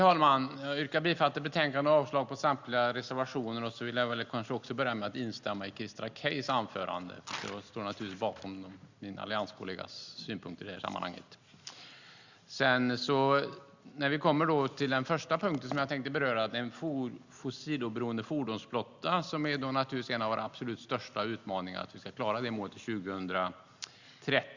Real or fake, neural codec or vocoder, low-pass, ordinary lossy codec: real; none; 7.2 kHz; Opus, 64 kbps